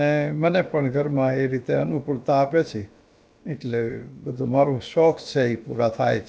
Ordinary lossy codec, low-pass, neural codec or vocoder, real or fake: none; none; codec, 16 kHz, about 1 kbps, DyCAST, with the encoder's durations; fake